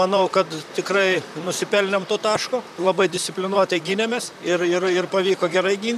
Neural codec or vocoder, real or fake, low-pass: vocoder, 44.1 kHz, 128 mel bands, Pupu-Vocoder; fake; 14.4 kHz